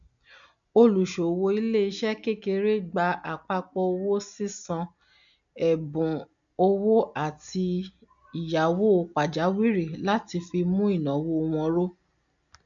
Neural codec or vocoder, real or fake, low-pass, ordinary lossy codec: none; real; 7.2 kHz; none